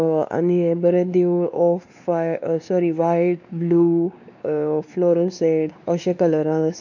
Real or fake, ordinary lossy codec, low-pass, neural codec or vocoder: fake; none; 7.2 kHz; codec, 16 kHz, 2 kbps, X-Codec, WavLM features, trained on Multilingual LibriSpeech